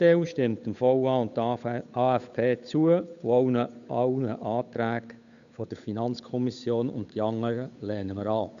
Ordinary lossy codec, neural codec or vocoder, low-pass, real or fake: none; codec, 16 kHz, 8 kbps, FunCodec, trained on Chinese and English, 25 frames a second; 7.2 kHz; fake